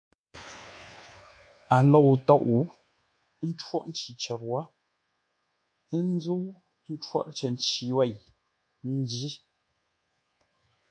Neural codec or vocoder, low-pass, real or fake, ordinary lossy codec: codec, 24 kHz, 1.2 kbps, DualCodec; 9.9 kHz; fake; MP3, 64 kbps